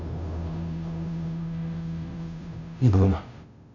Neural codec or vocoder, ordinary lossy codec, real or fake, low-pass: codec, 16 kHz, 0.5 kbps, FunCodec, trained on Chinese and English, 25 frames a second; none; fake; 7.2 kHz